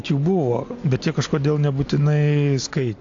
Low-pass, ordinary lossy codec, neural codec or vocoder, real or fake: 7.2 kHz; AAC, 48 kbps; none; real